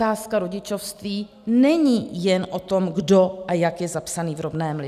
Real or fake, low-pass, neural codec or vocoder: real; 14.4 kHz; none